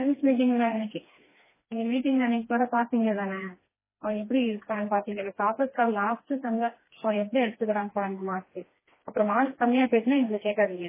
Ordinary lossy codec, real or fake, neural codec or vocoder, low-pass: MP3, 16 kbps; fake; codec, 16 kHz, 2 kbps, FreqCodec, smaller model; 3.6 kHz